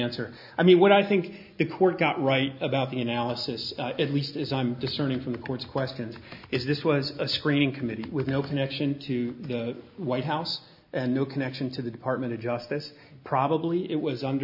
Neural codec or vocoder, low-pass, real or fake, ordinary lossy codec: none; 5.4 kHz; real; MP3, 48 kbps